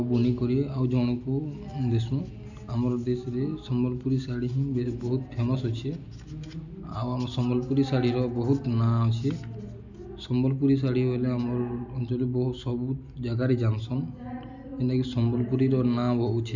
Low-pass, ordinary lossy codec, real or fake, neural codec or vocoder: 7.2 kHz; none; real; none